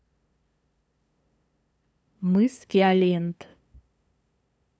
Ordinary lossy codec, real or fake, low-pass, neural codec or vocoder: none; fake; none; codec, 16 kHz, 2 kbps, FunCodec, trained on LibriTTS, 25 frames a second